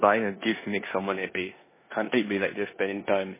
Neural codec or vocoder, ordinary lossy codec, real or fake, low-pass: codec, 16 kHz in and 24 kHz out, 0.9 kbps, LongCat-Audio-Codec, four codebook decoder; MP3, 16 kbps; fake; 3.6 kHz